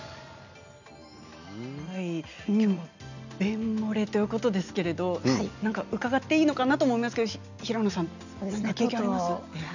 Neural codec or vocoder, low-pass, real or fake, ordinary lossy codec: none; 7.2 kHz; real; none